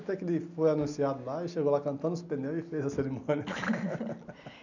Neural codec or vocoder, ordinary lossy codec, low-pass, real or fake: none; none; 7.2 kHz; real